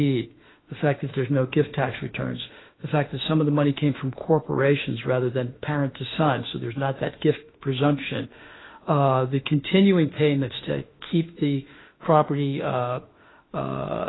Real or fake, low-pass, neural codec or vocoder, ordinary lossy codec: fake; 7.2 kHz; autoencoder, 48 kHz, 32 numbers a frame, DAC-VAE, trained on Japanese speech; AAC, 16 kbps